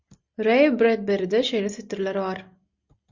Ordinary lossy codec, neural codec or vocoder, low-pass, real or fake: Opus, 64 kbps; none; 7.2 kHz; real